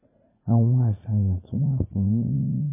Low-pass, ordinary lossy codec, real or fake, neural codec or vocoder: 3.6 kHz; MP3, 16 kbps; fake; vocoder, 44.1 kHz, 80 mel bands, Vocos